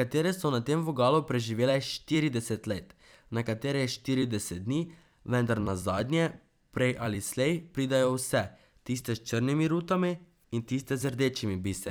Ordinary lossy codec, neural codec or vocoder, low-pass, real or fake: none; vocoder, 44.1 kHz, 128 mel bands every 256 samples, BigVGAN v2; none; fake